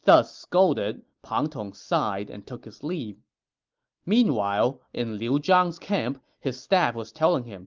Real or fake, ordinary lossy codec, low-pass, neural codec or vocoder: real; Opus, 32 kbps; 7.2 kHz; none